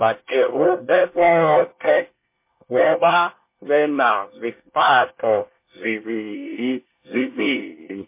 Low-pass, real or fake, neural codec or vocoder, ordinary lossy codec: 3.6 kHz; fake; codec, 24 kHz, 1 kbps, SNAC; MP3, 24 kbps